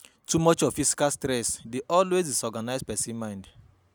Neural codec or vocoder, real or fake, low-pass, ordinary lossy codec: none; real; none; none